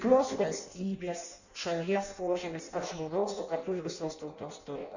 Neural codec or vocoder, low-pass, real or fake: codec, 16 kHz in and 24 kHz out, 0.6 kbps, FireRedTTS-2 codec; 7.2 kHz; fake